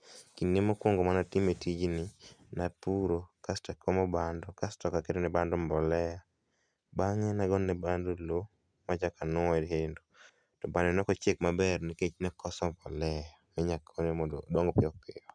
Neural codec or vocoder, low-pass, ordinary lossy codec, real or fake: none; 9.9 kHz; none; real